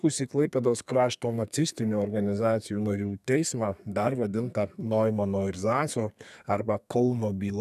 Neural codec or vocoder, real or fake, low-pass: codec, 32 kHz, 1.9 kbps, SNAC; fake; 14.4 kHz